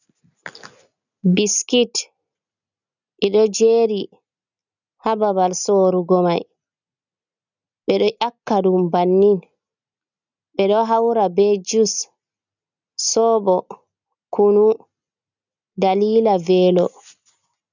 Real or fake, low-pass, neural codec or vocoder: real; 7.2 kHz; none